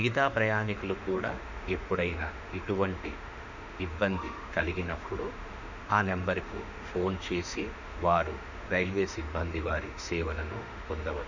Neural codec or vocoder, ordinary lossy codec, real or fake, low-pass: autoencoder, 48 kHz, 32 numbers a frame, DAC-VAE, trained on Japanese speech; none; fake; 7.2 kHz